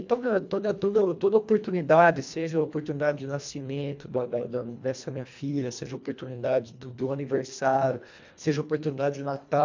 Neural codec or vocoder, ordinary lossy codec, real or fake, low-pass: codec, 24 kHz, 1.5 kbps, HILCodec; MP3, 64 kbps; fake; 7.2 kHz